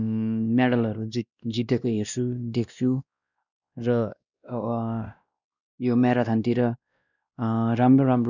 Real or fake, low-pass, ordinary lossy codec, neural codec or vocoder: fake; 7.2 kHz; none; codec, 16 kHz, 1 kbps, X-Codec, WavLM features, trained on Multilingual LibriSpeech